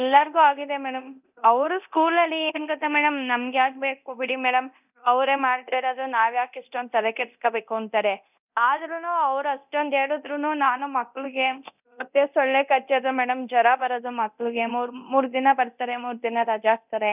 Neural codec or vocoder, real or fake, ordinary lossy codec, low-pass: codec, 24 kHz, 0.9 kbps, DualCodec; fake; none; 3.6 kHz